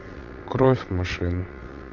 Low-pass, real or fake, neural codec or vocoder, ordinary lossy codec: 7.2 kHz; fake; vocoder, 22.05 kHz, 80 mel bands, WaveNeXt; AAC, 48 kbps